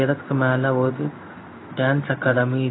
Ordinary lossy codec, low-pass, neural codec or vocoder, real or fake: AAC, 16 kbps; 7.2 kHz; codec, 16 kHz in and 24 kHz out, 1 kbps, XY-Tokenizer; fake